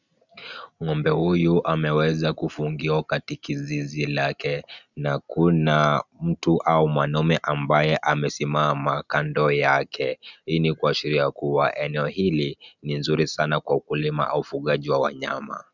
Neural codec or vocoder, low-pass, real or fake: none; 7.2 kHz; real